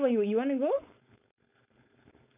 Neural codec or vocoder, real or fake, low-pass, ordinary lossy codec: codec, 16 kHz, 4.8 kbps, FACodec; fake; 3.6 kHz; none